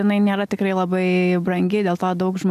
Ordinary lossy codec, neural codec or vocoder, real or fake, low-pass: AAC, 96 kbps; none; real; 14.4 kHz